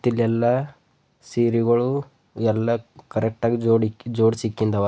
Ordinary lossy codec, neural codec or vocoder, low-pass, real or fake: none; none; none; real